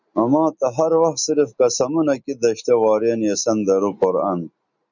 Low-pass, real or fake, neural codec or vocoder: 7.2 kHz; real; none